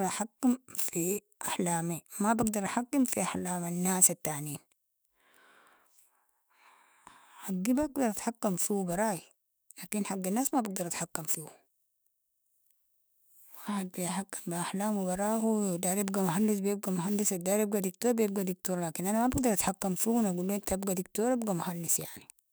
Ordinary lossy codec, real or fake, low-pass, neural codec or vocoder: none; fake; none; autoencoder, 48 kHz, 128 numbers a frame, DAC-VAE, trained on Japanese speech